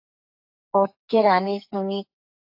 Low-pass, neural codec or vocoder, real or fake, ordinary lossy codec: 5.4 kHz; codec, 44.1 kHz, 2.6 kbps, SNAC; fake; AAC, 48 kbps